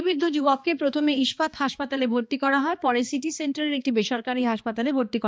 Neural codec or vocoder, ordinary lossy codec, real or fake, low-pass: codec, 16 kHz, 2 kbps, X-Codec, HuBERT features, trained on balanced general audio; none; fake; none